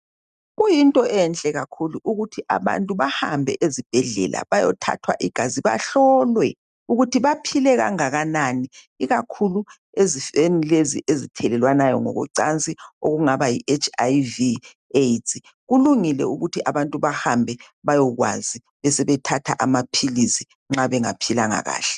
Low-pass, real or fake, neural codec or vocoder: 10.8 kHz; real; none